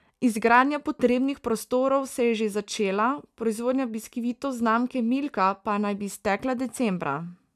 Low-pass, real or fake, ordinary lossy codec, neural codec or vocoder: 14.4 kHz; real; none; none